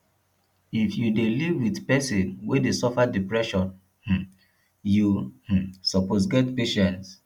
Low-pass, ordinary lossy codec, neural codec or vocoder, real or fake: 19.8 kHz; none; none; real